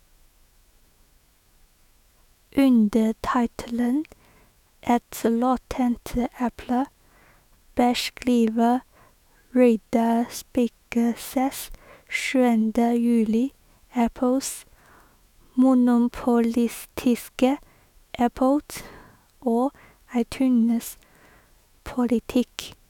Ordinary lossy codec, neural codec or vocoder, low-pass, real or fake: none; autoencoder, 48 kHz, 128 numbers a frame, DAC-VAE, trained on Japanese speech; 19.8 kHz; fake